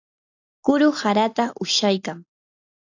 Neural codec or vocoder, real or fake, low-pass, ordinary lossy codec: none; real; 7.2 kHz; AAC, 48 kbps